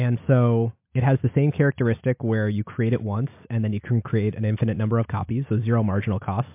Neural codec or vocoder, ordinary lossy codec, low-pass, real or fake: none; MP3, 32 kbps; 3.6 kHz; real